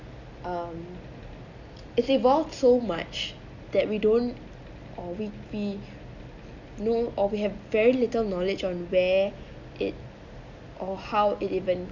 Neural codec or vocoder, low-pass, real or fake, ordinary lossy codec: none; 7.2 kHz; real; none